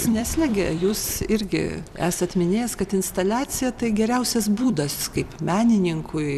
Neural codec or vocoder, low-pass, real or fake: vocoder, 44.1 kHz, 128 mel bands every 256 samples, BigVGAN v2; 14.4 kHz; fake